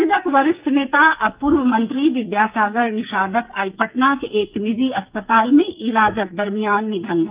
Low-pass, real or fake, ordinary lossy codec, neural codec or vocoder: 3.6 kHz; fake; Opus, 24 kbps; codec, 44.1 kHz, 2.6 kbps, SNAC